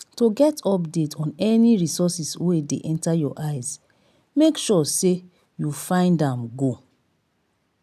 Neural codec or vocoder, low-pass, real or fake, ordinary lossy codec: none; 14.4 kHz; real; none